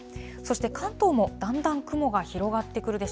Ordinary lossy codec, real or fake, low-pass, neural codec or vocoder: none; real; none; none